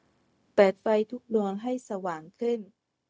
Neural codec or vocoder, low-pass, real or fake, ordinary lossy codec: codec, 16 kHz, 0.4 kbps, LongCat-Audio-Codec; none; fake; none